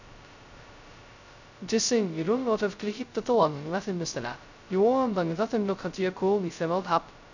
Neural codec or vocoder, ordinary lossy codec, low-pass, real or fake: codec, 16 kHz, 0.2 kbps, FocalCodec; none; 7.2 kHz; fake